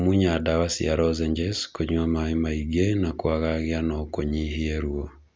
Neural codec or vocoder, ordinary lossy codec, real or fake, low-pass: none; none; real; none